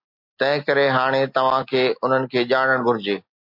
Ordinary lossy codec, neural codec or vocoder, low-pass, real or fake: MP3, 48 kbps; none; 5.4 kHz; real